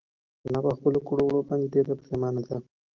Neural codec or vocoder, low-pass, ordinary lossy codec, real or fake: none; 7.2 kHz; Opus, 32 kbps; real